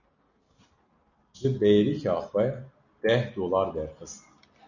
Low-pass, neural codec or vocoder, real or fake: 7.2 kHz; none; real